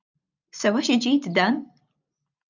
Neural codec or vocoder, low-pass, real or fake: none; 7.2 kHz; real